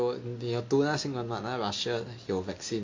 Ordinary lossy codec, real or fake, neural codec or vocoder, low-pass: MP3, 48 kbps; real; none; 7.2 kHz